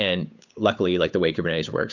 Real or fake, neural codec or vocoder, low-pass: fake; codec, 16 kHz, 8 kbps, FunCodec, trained on Chinese and English, 25 frames a second; 7.2 kHz